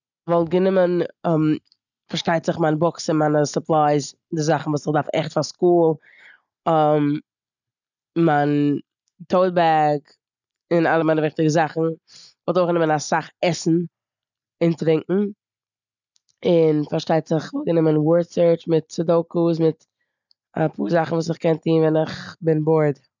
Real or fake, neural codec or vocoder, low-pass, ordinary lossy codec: real; none; 7.2 kHz; none